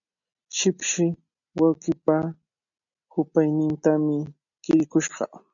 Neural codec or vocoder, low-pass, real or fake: none; 7.2 kHz; real